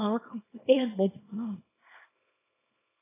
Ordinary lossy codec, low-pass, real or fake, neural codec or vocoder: AAC, 16 kbps; 3.6 kHz; fake; codec, 24 kHz, 0.9 kbps, WavTokenizer, small release